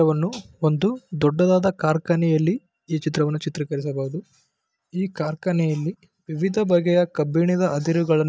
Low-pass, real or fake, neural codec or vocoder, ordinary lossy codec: none; real; none; none